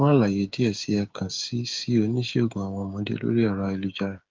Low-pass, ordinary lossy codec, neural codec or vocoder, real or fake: 7.2 kHz; Opus, 32 kbps; codec, 16 kHz, 8 kbps, FreqCodec, smaller model; fake